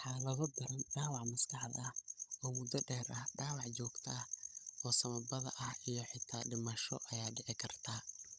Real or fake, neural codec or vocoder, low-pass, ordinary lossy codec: fake; codec, 16 kHz, 16 kbps, FunCodec, trained on Chinese and English, 50 frames a second; none; none